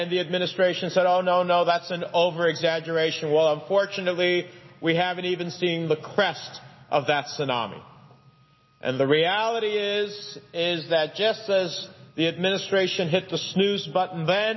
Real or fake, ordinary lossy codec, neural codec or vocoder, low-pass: real; MP3, 24 kbps; none; 7.2 kHz